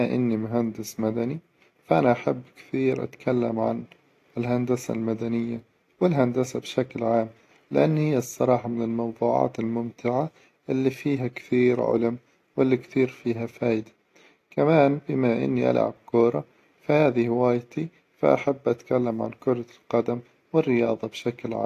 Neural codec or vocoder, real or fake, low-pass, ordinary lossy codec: none; real; 14.4 kHz; AAC, 48 kbps